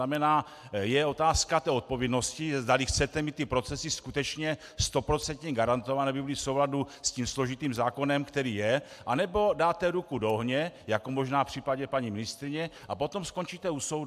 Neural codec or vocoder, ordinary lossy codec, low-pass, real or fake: none; AAC, 96 kbps; 14.4 kHz; real